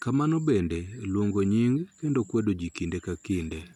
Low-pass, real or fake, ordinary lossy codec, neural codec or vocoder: 19.8 kHz; real; none; none